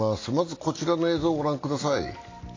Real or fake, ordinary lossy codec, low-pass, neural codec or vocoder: real; AAC, 32 kbps; 7.2 kHz; none